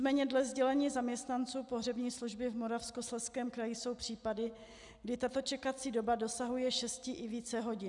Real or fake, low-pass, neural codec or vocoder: real; 10.8 kHz; none